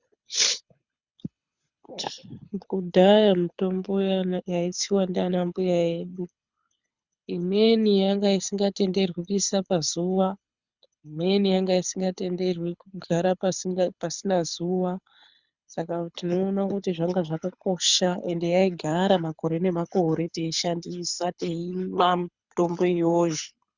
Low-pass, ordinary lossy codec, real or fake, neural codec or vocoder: 7.2 kHz; Opus, 64 kbps; fake; codec, 24 kHz, 6 kbps, HILCodec